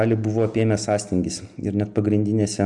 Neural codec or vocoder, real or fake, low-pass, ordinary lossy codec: none; real; 10.8 kHz; Opus, 64 kbps